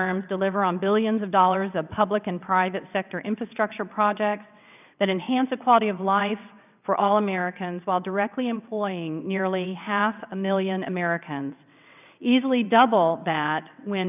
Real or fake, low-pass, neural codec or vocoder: fake; 3.6 kHz; vocoder, 22.05 kHz, 80 mel bands, WaveNeXt